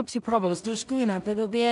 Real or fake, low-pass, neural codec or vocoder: fake; 10.8 kHz; codec, 16 kHz in and 24 kHz out, 0.4 kbps, LongCat-Audio-Codec, two codebook decoder